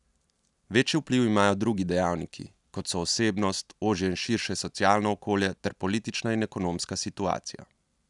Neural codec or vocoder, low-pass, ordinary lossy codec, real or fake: none; 10.8 kHz; none; real